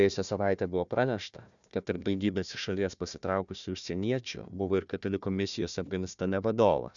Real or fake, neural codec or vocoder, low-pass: fake; codec, 16 kHz, 1 kbps, FunCodec, trained on Chinese and English, 50 frames a second; 7.2 kHz